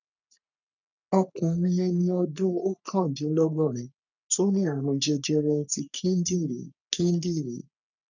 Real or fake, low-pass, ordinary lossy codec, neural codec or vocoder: fake; 7.2 kHz; none; codec, 44.1 kHz, 3.4 kbps, Pupu-Codec